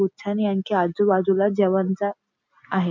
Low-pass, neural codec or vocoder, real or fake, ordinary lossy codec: 7.2 kHz; none; real; none